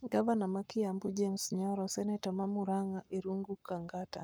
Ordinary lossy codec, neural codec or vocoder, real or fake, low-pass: none; codec, 44.1 kHz, 7.8 kbps, Pupu-Codec; fake; none